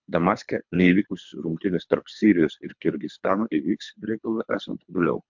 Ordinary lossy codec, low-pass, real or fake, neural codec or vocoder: MP3, 64 kbps; 7.2 kHz; fake; codec, 24 kHz, 3 kbps, HILCodec